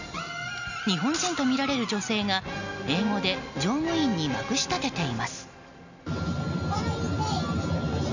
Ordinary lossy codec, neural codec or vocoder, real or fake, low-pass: none; none; real; 7.2 kHz